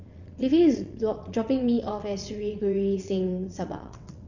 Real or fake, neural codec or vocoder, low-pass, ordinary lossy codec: fake; vocoder, 22.05 kHz, 80 mel bands, WaveNeXt; 7.2 kHz; none